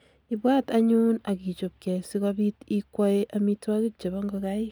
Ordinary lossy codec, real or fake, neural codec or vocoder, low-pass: none; real; none; none